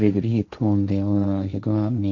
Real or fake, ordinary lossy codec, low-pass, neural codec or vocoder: fake; none; 7.2 kHz; codec, 16 kHz, 1.1 kbps, Voila-Tokenizer